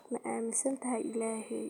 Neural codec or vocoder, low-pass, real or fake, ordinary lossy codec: none; 19.8 kHz; real; none